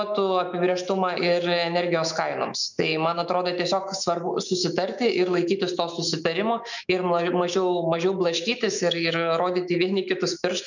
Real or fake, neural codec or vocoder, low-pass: fake; autoencoder, 48 kHz, 128 numbers a frame, DAC-VAE, trained on Japanese speech; 7.2 kHz